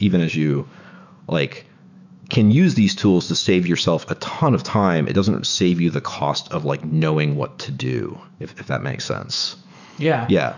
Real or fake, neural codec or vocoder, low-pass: fake; autoencoder, 48 kHz, 128 numbers a frame, DAC-VAE, trained on Japanese speech; 7.2 kHz